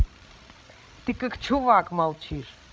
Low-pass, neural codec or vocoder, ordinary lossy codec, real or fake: none; codec, 16 kHz, 16 kbps, FreqCodec, larger model; none; fake